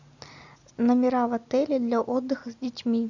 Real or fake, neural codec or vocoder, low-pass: real; none; 7.2 kHz